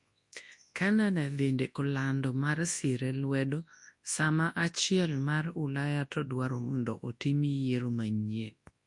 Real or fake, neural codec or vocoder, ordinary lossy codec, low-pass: fake; codec, 24 kHz, 0.9 kbps, WavTokenizer, large speech release; MP3, 48 kbps; 10.8 kHz